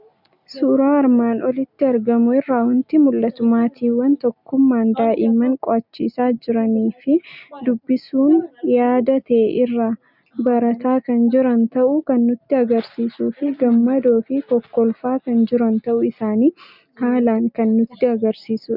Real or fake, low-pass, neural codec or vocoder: real; 5.4 kHz; none